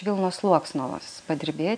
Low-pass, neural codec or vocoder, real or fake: 9.9 kHz; none; real